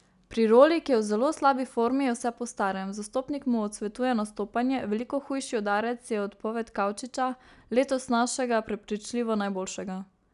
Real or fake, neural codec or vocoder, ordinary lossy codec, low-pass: real; none; none; 10.8 kHz